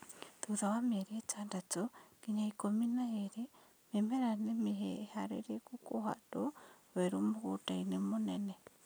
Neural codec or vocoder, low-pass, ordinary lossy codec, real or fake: none; none; none; real